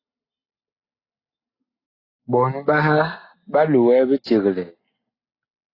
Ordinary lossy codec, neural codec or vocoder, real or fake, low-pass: AAC, 24 kbps; none; real; 5.4 kHz